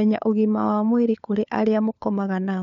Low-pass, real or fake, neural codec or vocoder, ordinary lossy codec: 7.2 kHz; fake; codec, 16 kHz, 4 kbps, FunCodec, trained on LibriTTS, 50 frames a second; none